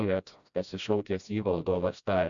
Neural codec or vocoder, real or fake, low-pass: codec, 16 kHz, 1 kbps, FreqCodec, smaller model; fake; 7.2 kHz